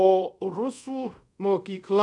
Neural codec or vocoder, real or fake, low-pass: codec, 24 kHz, 0.5 kbps, DualCodec; fake; 10.8 kHz